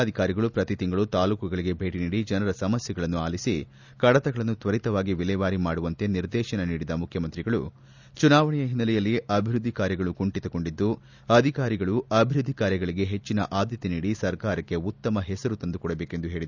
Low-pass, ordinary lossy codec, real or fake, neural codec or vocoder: 7.2 kHz; none; real; none